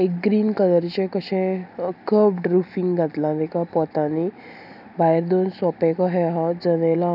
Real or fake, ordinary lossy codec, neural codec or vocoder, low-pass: real; none; none; 5.4 kHz